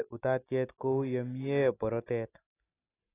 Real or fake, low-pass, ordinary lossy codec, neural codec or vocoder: real; 3.6 kHz; AAC, 16 kbps; none